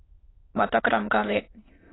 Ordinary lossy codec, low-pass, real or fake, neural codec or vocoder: AAC, 16 kbps; 7.2 kHz; fake; autoencoder, 22.05 kHz, a latent of 192 numbers a frame, VITS, trained on many speakers